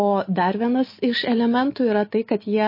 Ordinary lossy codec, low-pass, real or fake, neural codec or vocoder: MP3, 24 kbps; 5.4 kHz; real; none